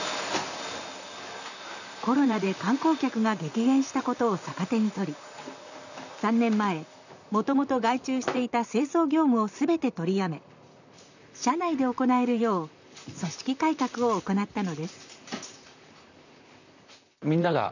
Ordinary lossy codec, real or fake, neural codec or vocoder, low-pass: none; fake; vocoder, 44.1 kHz, 128 mel bands, Pupu-Vocoder; 7.2 kHz